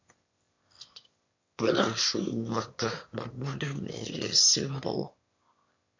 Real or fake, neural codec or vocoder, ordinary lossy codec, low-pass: fake; autoencoder, 22.05 kHz, a latent of 192 numbers a frame, VITS, trained on one speaker; MP3, 48 kbps; 7.2 kHz